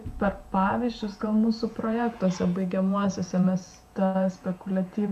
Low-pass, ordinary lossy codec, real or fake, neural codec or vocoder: 14.4 kHz; MP3, 64 kbps; fake; vocoder, 48 kHz, 128 mel bands, Vocos